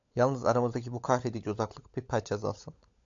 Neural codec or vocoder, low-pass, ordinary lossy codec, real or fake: codec, 16 kHz, 16 kbps, FunCodec, trained on LibriTTS, 50 frames a second; 7.2 kHz; AAC, 64 kbps; fake